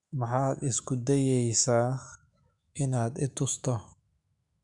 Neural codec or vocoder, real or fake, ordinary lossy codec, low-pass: autoencoder, 48 kHz, 128 numbers a frame, DAC-VAE, trained on Japanese speech; fake; MP3, 96 kbps; 10.8 kHz